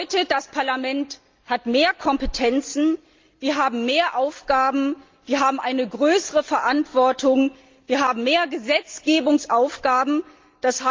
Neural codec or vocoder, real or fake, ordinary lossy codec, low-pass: none; real; Opus, 32 kbps; 7.2 kHz